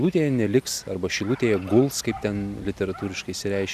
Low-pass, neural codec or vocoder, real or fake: 14.4 kHz; none; real